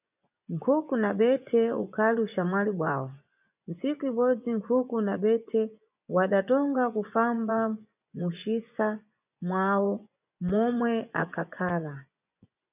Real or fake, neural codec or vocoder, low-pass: fake; vocoder, 24 kHz, 100 mel bands, Vocos; 3.6 kHz